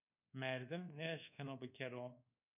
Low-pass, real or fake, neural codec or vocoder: 3.6 kHz; fake; codec, 24 kHz, 1.2 kbps, DualCodec